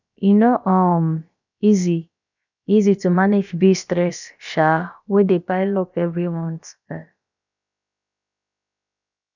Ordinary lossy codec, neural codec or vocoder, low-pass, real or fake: none; codec, 16 kHz, about 1 kbps, DyCAST, with the encoder's durations; 7.2 kHz; fake